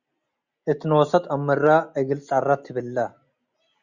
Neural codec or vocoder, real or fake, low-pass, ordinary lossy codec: none; real; 7.2 kHz; Opus, 64 kbps